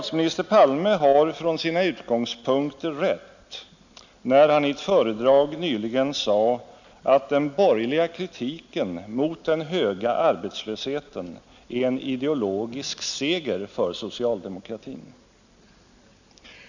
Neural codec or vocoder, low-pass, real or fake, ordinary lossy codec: none; 7.2 kHz; real; none